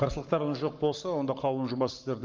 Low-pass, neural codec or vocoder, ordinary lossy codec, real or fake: 7.2 kHz; codec, 44.1 kHz, 7.8 kbps, Pupu-Codec; Opus, 32 kbps; fake